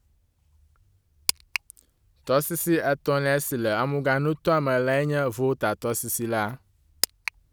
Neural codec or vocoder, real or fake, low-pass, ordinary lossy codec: none; real; none; none